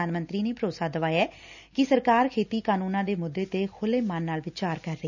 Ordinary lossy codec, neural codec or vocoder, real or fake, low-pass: none; none; real; 7.2 kHz